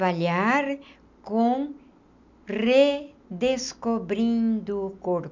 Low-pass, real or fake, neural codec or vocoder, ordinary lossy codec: 7.2 kHz; real; none; MP3, 64 kbps